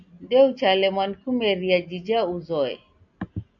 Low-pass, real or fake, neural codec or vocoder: 7.2 kHz; real; none